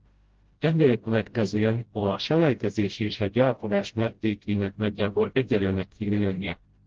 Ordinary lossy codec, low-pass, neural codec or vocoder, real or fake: Opus, 16 kbps; 7.2 kHz; codec, 16 kHz, 0.5 kbps, FreqCodec, smaller model; fake